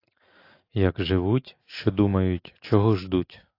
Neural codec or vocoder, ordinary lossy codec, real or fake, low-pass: none; AAC, 32 kbps; real; 5.4 kHz